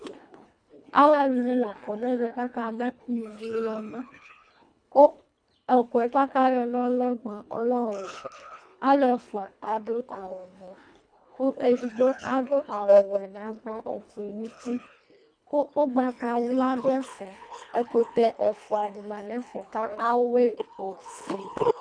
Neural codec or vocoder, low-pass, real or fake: codec, 24 kHz, 1.5 kbps, HILCodec; 9.9 kHz; fake